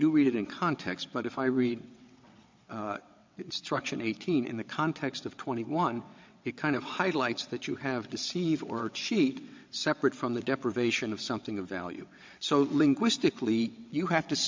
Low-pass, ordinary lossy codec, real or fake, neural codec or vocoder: 7.2 kHz; MP3, 64 kbps; fake; vocoder, 22.05 kHz, 80 mel bands, WaveNeXt